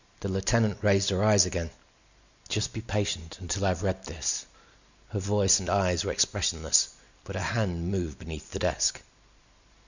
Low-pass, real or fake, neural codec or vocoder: 7.2 kHz; real; none